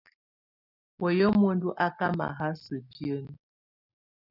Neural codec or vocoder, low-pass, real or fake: none; 5.4 kHz; real